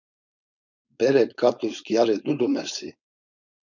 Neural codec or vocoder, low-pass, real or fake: codec, 16 kHz, 4.8 kbps, FACodec; 7.2 kHz; fake